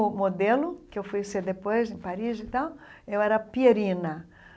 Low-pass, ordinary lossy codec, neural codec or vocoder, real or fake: none; none; none; real